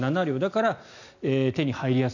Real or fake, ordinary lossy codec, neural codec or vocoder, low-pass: real; none; none; 7.2 kHz